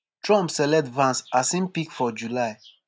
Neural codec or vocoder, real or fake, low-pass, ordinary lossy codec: none; real; none; none